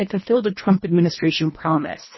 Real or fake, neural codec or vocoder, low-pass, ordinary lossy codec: fake; codec, 24 kHz, 1.5 kbps, HILCodec; 7.2 kHz; MP3, 24 kbps